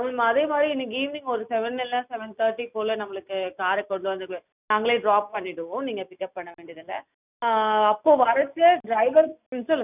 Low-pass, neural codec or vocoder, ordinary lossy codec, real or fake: 3.6 kHz; none; none; real